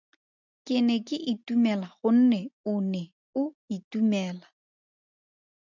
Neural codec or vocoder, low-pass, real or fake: none; 7.2 kHz; real